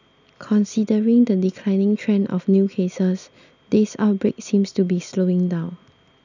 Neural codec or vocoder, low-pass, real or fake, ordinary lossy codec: none; 7.2 kHz; real; none